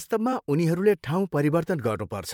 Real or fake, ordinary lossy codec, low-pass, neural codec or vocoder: fake; MP3, 96 kbps; 19.8 kHz; vocoder, 44.1 kHz, 128 mel bands, Pupu-Vocoder